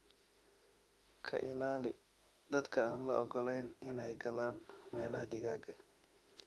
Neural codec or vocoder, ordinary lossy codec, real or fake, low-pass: autoencoder, 48 kHz, 32 numbers a frame, DAC-VAE, trained on Japanese speech; Opus, 32 kbps; fake; 19.8 kHz